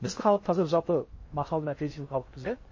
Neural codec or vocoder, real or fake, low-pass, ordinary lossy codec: codec, 16 kHz in and 24 kHz out, 0.6 kbps, FocalCodec, streaming, 2048 codes; fake; 7.2 kHz; MP3, 32 kbps